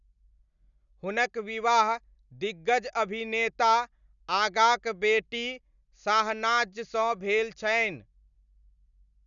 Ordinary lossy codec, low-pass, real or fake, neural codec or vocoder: none; 7.2 kHz; real; none